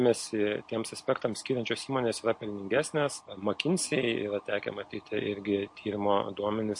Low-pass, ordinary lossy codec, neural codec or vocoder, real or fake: 10.8 kHz; MP3, 48 kbps; none; real